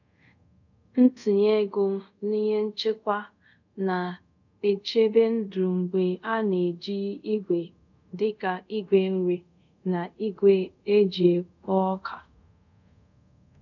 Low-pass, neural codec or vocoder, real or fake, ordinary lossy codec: 7.2 kHz; codec, 24 kHz, 0.5 kbps, DualCodec; fake; none